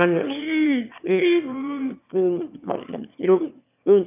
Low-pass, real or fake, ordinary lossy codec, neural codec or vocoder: 3.6 kHz; fake; none; autoencoder, 22.05 kHz, a latent of 192 numbers a frame, VITS, trained on one speaker